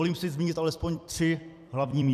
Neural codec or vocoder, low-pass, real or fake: vocoder, 44.1 kHz, 128 mel bands every 256 samples, BigVGAN v2; 14.4 kHz; fake